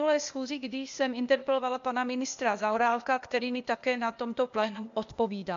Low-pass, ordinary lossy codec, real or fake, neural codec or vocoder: 7.2 kHz; MP3, 64 kbps; fake; codec, 16 kHz, 0.8 kbps, ZipCodec